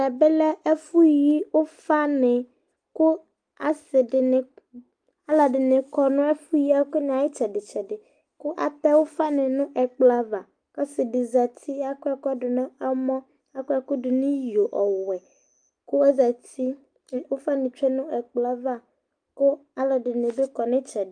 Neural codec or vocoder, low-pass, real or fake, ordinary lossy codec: none; 9.9 kHz; real; Opus, 32 kbps